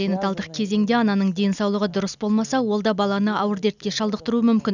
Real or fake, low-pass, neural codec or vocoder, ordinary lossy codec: real; 7.2 kHz; none; none